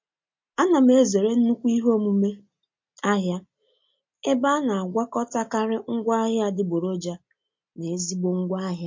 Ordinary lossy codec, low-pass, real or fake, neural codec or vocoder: MP3, 48 kbps; 7.2 kHz; real; none